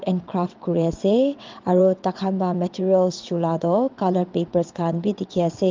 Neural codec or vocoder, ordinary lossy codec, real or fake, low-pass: vocoder, 22.05 kHz, 80 mel bands, WaveNeXt; Opus, 24 kbps; fake; 7.2 kHz